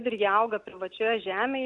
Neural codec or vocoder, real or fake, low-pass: none; real; 10.8 kHz